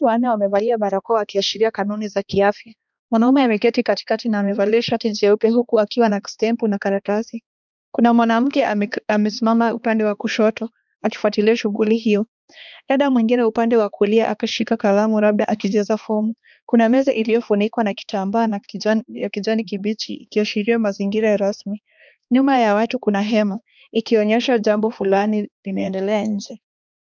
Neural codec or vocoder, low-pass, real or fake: codec, 16 kHz, 2 kbps, X-Codec, HuBERT features, trained on balanced general audio; 7.2 kHz; fake